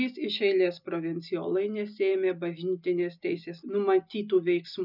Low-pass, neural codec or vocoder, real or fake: 5.4 kHz; none; real